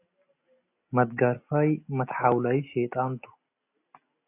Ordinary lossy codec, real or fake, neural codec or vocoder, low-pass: AAC, 24 kbps; real; none; 3.6 kHz